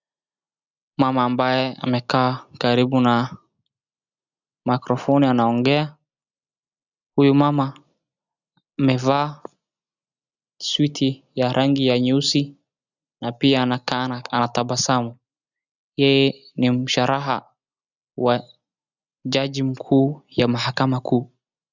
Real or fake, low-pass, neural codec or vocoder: real; 7.2 kHz; none